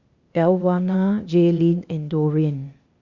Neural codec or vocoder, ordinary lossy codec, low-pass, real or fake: codec, 16 kHz, 0.8 kbps, ZipCodec; Opus, 64 kbps; 7.2 kHz; fake